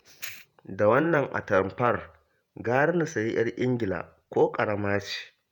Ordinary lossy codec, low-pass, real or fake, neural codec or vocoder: none; 19.8 kHz; fake; vocoder, 48 kHz, 128 mel bands, Vocos